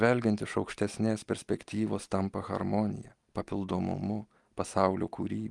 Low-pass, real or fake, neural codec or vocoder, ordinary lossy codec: 10.8 kHz; real; none; Opus, 24 kbps